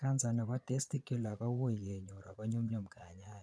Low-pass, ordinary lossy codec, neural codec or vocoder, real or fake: none; none; vocoder, 22.05 kHz, 80 mel bands, Vocos; fake